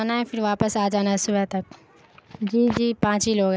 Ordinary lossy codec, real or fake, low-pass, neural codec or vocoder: none; real; none; none